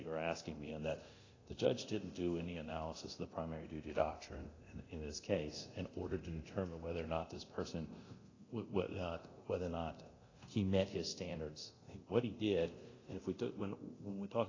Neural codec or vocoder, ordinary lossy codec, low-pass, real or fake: codec, 24 kHz, 0.9 kbps, DualCodec; AAC, 32 kbps; 7.2 kHz; fake